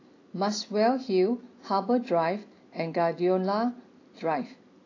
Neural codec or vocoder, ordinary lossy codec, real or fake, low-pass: none; AAC, 32 kbps; real; 7.2 kHz